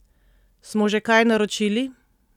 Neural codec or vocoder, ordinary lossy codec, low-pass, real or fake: none; none; 19.8 kHz; real